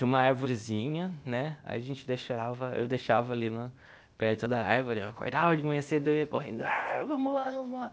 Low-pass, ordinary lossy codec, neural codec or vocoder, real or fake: none; none; codec, 16 kHz, 0.8 kbps, ZipCodec; fake